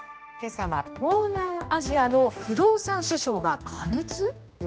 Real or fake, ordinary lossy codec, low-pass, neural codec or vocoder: fake; none; none; codec, 16 kHz, 1 kbps, X-Codec, HuBERT features, trained on general audio